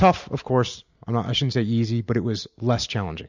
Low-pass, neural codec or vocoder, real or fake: 7.2 kHz; none; real